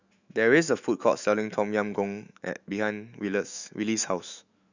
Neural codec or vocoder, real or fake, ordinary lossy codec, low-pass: autoencoder, 48 kHz, 128 numbers a frame, DAC-VAE, trained on Japanese speech; fake; Opus, 64 kbps; 7.2 kHz